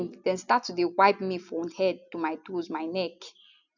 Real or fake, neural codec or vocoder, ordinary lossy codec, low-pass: real; none; none; 7.2 kHz